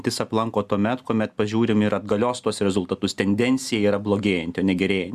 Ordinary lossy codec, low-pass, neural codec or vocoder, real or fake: Opus, 64 kbps; 14.4 kHz; none; real